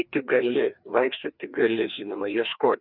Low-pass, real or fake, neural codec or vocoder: 5.4 kHz; fake; codec, 16 kHz, 2 kbps, FreqCodec, larger model